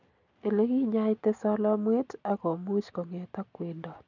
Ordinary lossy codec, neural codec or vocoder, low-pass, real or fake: none; none; 7.2 kHz; real